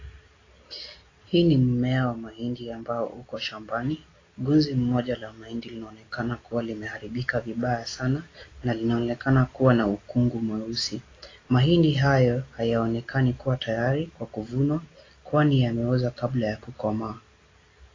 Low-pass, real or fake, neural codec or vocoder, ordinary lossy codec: 7.2 kHz; real; none; AAC, 32 kbps